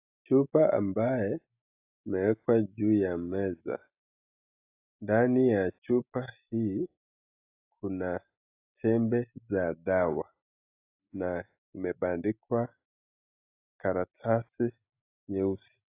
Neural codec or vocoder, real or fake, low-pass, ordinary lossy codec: none; real; 3.6 kHz; AAC, 24 kbps